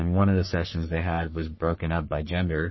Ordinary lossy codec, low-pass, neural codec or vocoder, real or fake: MP3, 24 kbps; 7.2 kHz; codec, 44.1 kHz, 3.4 kbps, Pupu-Codec; fake